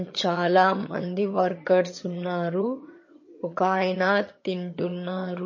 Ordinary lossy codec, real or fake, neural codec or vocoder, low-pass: MP3, 32 kbps; fake; vocoder, 22.05 kHz, 80 mel bands, HiFi-GAN; 7.2 kHz